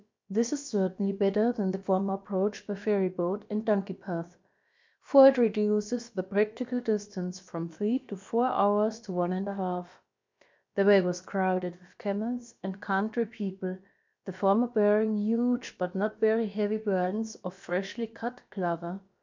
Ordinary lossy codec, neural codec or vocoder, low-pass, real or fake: MP3, 64 kbps; codec, 16 kHz, about 1 kbps, DyCAST, with the encoder's durations; 7.2 kHz; fake